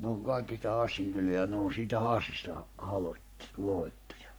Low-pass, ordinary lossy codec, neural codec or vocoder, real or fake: none; none; codec, 44.1 kHz, 3.4 kbps, Pupu-Codec; fake